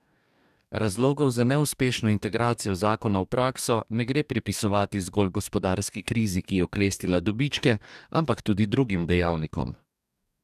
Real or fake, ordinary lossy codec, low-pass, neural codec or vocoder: fake; none; 14.4 kHz; codec, 44.1 kHz, 2.6 kbps, DAC